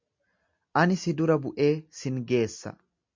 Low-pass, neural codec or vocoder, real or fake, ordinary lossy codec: 7.2 kHz; none; real; MP3, 64 kbps